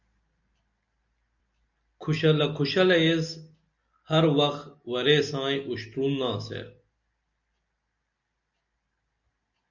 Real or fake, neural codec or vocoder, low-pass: real; none; 7.2 kHz